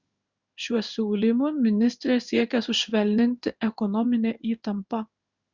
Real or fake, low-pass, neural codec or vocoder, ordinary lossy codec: fake; 7.2 kHz; codec, 16 kHz in and 24 kHz out, 1 kbps, XY-Tokenizer; Opus, 64 kbps